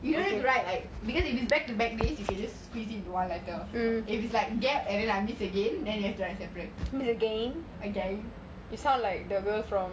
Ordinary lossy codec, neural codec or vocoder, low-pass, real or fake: none; none; none; real